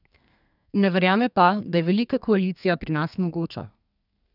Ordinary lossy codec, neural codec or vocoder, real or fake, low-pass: none; codec, 32 kHz, 1.9 kbps, SNAC; fake; 5.4 kHz